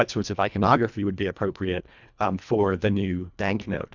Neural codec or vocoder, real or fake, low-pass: codec, 24 kHz, 1.5 kbps, HILCodec; fake; 7.2 kHz